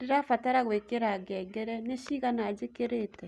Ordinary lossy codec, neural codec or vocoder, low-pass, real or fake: none; none; none; real